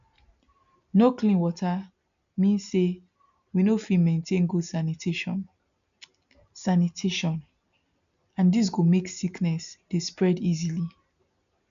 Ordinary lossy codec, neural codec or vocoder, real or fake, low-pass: none; none; real; 7.2 kHz